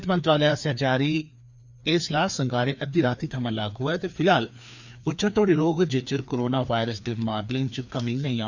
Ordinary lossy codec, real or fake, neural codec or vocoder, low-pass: none; fake; codec, 16 kHz, 2 kbps, FreqCodec, larger model; 7.2 kHz